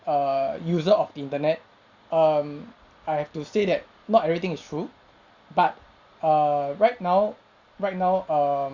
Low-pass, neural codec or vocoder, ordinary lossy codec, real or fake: 7.2 kHz; none; Opus, 64 kbps; real